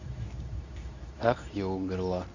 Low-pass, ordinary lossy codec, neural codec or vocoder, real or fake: 7.2 kHz; none; codec, 24 kHz, 0.9 kbps, WavTokenizer, medium speech release version 2; fake